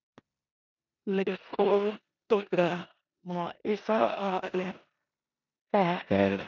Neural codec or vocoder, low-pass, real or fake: codec, 16 kHz in and 24 kHz out, 0.9 kbps, LongCat-Audio-Codec, four codebook decoder; 7.2 kHz; fake